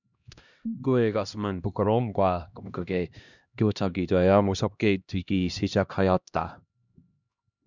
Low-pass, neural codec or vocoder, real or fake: 7.2 kHz; codec, 16 kHz, 1 kbps, X-Codec, HuBERT features, trained on LibriSpeech; fake